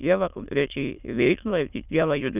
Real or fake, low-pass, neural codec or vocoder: fake; 3.6 kHz; autoencoder, 22.05 kHz, a latent of 192 numbers a frame, VITS, trained on many speakers